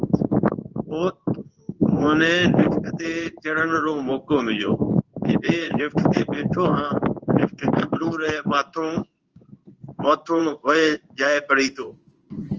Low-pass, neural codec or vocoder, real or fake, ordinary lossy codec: 7.2 kHz; codec, 16 kHz in and 24 kHz out, 1 kbps, XY-Tokenizer; fake; Opus, 24 kbps